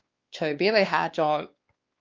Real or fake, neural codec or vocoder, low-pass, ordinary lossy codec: fake; codec, 16 kHz, 2 kbps, X-Codec, WavLM features, trained on Multilingual LibriSpeech; 7.2 kHz; Opus, 32 kbps